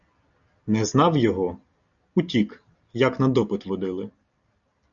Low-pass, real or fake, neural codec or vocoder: 7.2 kHz; real; none